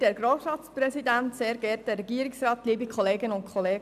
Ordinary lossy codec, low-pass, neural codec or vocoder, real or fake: none; 14.4 kHz; none; real